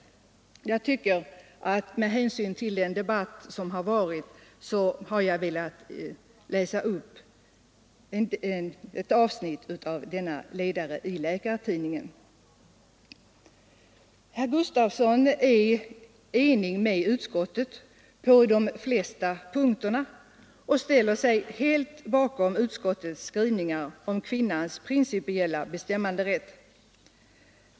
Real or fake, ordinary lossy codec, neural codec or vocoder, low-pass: real; none; none; none